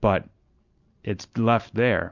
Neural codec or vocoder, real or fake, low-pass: none; real; 7.2 kHz